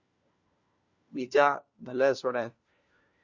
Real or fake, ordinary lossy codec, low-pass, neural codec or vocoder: fake; Opus, 64 kbps; 7.2 kHz; codec, 16 kHz, 1 kbps, FunCodec, trained on LibriTTS, 50 frames a second